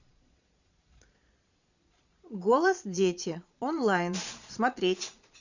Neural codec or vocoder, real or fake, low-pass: vocoder, 22.05 kHz, 80 mel bands, Vocos; fake; 7.2 kHz